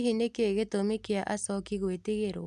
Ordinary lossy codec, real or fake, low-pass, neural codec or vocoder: none; real; none; none